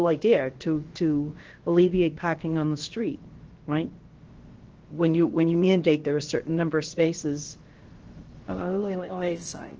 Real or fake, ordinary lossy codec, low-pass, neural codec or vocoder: fake; Opus, 16 kbps; 7.2 kHz; codec, 16 kHz, about 1 kbps, DyCAST, with the encoder's durations